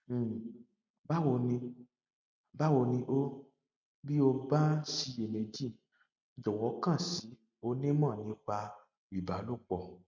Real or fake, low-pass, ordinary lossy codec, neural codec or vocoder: real; 7.2 kHz; none; none